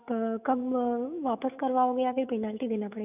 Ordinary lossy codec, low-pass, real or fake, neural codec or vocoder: none; 3.6 kHz; fake; autoencoder, 48 kHz, 128 numbers a frame, DAC-VAE, trained on Japanese speech